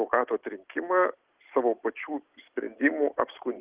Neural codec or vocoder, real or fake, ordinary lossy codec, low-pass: none; real; Opus, 16 kbps; 3.6 kHz